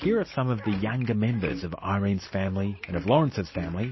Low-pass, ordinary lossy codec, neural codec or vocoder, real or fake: 7.2 kHz; MP3, 24 kbps; none; real